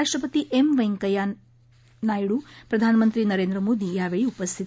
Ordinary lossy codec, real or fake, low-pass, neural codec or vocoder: none; real; none; none